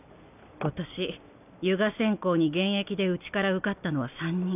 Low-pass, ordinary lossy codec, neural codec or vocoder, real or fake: 3.6 kHz; none; none; real